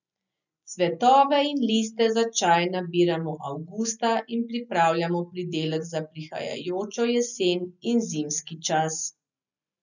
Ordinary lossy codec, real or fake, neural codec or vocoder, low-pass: none; real; none; 7.2 kHz